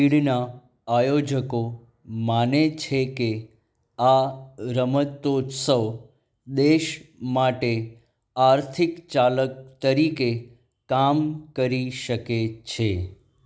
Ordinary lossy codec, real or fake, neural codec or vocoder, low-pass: none; real; none; none